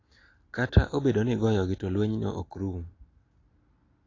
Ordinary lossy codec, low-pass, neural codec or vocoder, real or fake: AAC, 32 kbps; 7.2 kHz; none; real